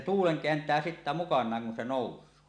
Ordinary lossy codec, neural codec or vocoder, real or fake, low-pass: none; none; real; 9.9 kHz